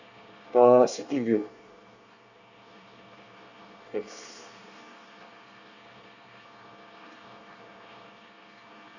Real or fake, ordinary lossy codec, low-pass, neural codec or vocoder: fake; none; 7.2 kHz; codec, 24 kHz, 1 kbps, SNAC